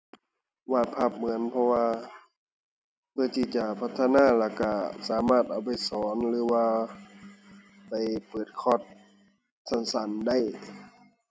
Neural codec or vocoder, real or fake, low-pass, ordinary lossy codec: none; real; none; none